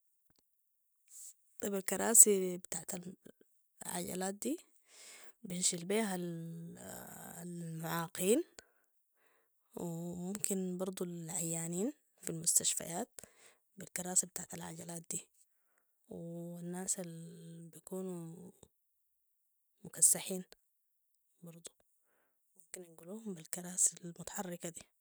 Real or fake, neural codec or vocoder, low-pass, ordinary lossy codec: real; none; none; none